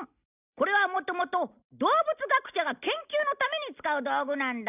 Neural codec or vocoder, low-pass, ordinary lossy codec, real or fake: none; 3.6 kHz; none; real